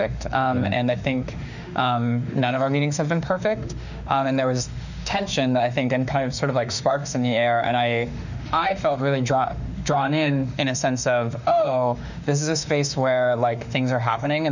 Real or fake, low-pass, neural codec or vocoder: fake; 7.2 kHz; autoencoder, 48 kHz, 32 numbers a frame, DAC-VAE, trained on Japanese speech